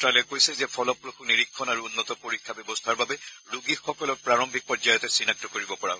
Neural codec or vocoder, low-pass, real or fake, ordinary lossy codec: none; none; real; none